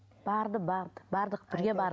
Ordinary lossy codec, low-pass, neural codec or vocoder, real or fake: none; none; none; real